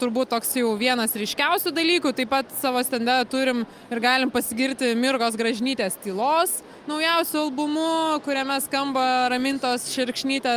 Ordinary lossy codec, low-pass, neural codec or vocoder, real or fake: Opus, 32 kbps; 14.4 kHz; none; real